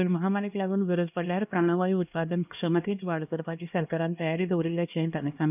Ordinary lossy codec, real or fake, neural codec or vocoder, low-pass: none; fake; codec, 16 kHz, 1 kbps, X-Codec, HuBERT features, trained on LibriSpeech; 3.6 kHz